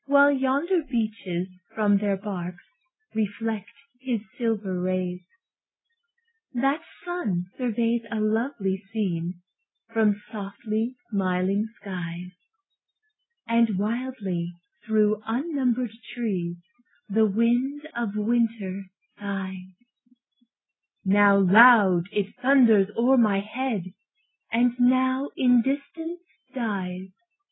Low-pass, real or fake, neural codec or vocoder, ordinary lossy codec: 7.2 kHz; real; none; AAC, 16 kbps